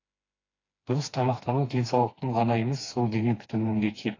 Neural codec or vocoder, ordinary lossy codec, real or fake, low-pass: codec, 16 kHz, 2 kbps, FreqCodec, smaller model; AAC, 32 kbps; fake; 7.2 kHz